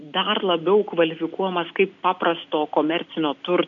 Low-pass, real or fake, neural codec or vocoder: 7.2 kHz; real; none